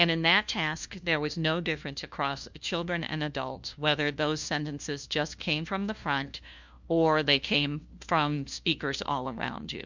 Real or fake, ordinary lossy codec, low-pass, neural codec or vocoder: fake; MP3, 64 kbps; 7.2 kHz; codec, 16 kHz, 1 kbps, FunCodec, trained on LibriTTS, 50 frames a second